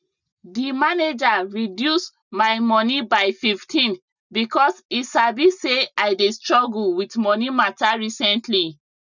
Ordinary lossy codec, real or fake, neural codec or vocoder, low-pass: none; real; none; 7.2 kHz